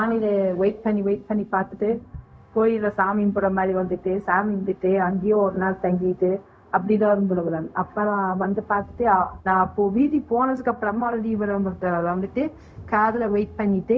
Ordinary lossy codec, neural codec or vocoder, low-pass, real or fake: none; codec, 16 kHz, 0.4 kbps, LongCat-Audio-Codec; none; fake